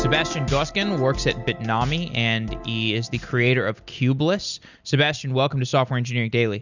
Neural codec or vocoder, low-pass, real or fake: none; 7.2 kHz; real